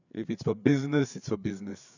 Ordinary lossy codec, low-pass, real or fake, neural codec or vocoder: none; 7.2 kHz; fake; codec, 16 kHz, 4 kbps, FreqCodec, larger model